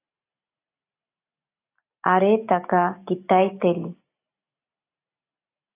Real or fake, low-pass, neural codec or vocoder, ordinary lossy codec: real; 3.6 kHz; none; AAC, 24 kbps